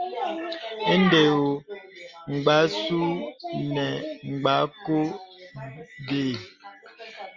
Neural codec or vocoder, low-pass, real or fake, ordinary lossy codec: none; 7.2 kHz; real; Opus, 32 kbps